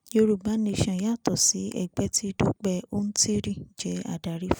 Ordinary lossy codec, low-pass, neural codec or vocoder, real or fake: none; none; none; real